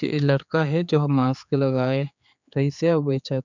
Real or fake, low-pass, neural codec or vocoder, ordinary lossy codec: fake; 7.2 kHz; codec, 16 kHz, 4 kbps, X-Codec, HuBERT features, trained on general audio; none